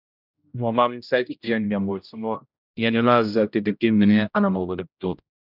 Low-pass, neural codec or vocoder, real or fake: 5.4 kHz; codec, 16 kHz, 0.5 kbps, X-Codec, HuBERT features, trained on general audio; fake